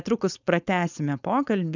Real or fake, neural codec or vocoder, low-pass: fake; codec, 16 kHz, 4.8 kbps, FACodec; 7.2 kHz